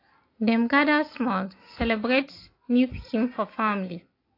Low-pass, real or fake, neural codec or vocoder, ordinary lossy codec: 5.4 kHz; real; none; AAC, 32 kbps